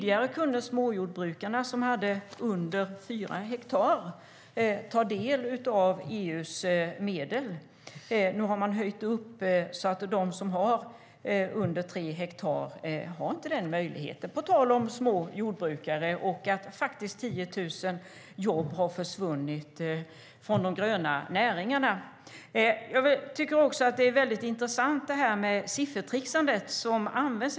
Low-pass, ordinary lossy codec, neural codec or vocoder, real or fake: none; none; none; real